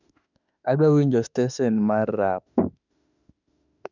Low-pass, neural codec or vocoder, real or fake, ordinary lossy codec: 7.2 kHz; autoencoder, 48 kHz, 32 numbers a frame, DAC-VAE, trained on Japanese speech; fake; none